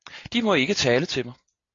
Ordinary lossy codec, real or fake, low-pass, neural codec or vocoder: AAC, 48 kbps; real; 7.2 kHz; none